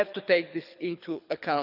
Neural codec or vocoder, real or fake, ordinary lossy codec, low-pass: codec, 24 kHz, 6 kbps, HILCodec; fake; none; 5.4 kHz